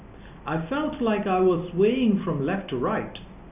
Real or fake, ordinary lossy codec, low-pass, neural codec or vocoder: real; none; 3.6 kHz; none